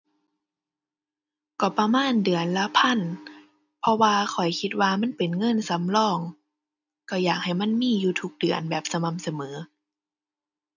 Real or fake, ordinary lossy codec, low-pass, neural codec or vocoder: real; none; 7.2 kHz; none